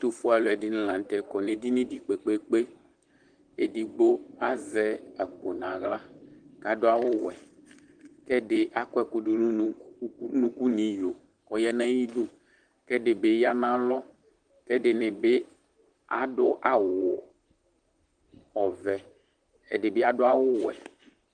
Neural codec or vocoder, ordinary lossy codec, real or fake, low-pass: vocoder, 44.1 kHz, 128 mel bands, Pupu-Vocoder; Opus, 24 kbps; fake; 9.9 kHz